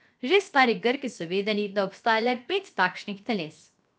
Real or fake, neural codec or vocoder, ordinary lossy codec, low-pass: fake; codec, 16 kHz, 0.7 kbps, FocalCodec; none; none